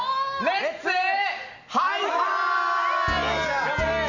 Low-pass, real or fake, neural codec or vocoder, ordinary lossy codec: 7.2 kHz; real; none; none